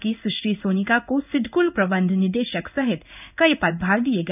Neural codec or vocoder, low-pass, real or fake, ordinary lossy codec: codec, 16 kHz in and 24 kHz out, 1 kbps, XY-Tokenizer; 3.6 kHz; fake; none